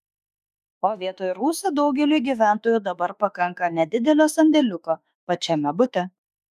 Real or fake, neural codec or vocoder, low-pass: fake; autoencoder, 48 kHz, 32 numbers a frame, DAC-VAE, trained on Japanese speech; 14.4 kHz